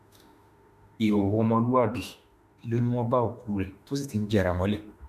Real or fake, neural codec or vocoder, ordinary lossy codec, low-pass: fake; autoencoder, 48 kHz, 32 numbers a frame, DAC-VAE, trained on Japanese speech; none; 14.4 kHz